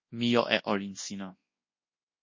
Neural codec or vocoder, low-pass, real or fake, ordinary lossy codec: codec, 24 kHz, 0.9 kbps, WavTokenizer, large speech release; 7.2 kHz; fake; MP3, 32 kbps